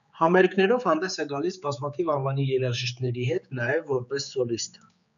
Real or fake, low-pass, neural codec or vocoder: fake; 7.2 kHz; codec, 16 kHz, 4 kbps, X-Codec, HuBERT features, trained on general audio